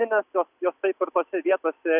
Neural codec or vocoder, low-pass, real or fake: none; 3.6 kHz; real